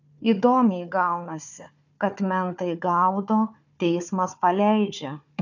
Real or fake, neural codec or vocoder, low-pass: fake; codec, 16 kHz, 4 kbps, FunCodec, trained on Chinese and English, 50 frames a second; 7.2 kHz